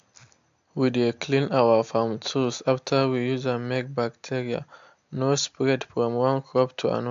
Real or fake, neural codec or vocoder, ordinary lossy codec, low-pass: real; none; AAC, 64 kbps; 7.2 kHz